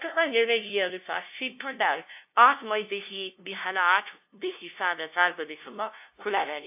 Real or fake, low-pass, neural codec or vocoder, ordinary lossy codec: fake; 3.6 kHz; codec, 16 kHz, 0.5 kbps, FunCodec, trained on LibriTTS, 25 frames a second; AAC, 32 kbps